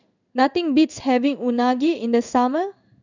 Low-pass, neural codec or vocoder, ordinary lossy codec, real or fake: 7.2 kHz; codec, 16 kHz in and 24 kHz out, 1 kbps, XY-Tokenizer; none; fake